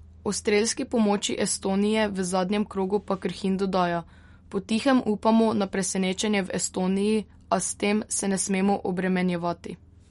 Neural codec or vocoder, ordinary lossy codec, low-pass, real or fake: none; MP3, 48 kbps; 19.8 kHz; real